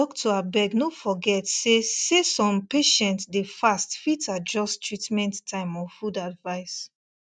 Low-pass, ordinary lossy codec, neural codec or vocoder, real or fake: 9.9 kHz; none; none; real